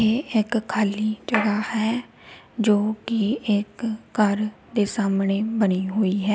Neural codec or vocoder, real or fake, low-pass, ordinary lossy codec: none; real; none; none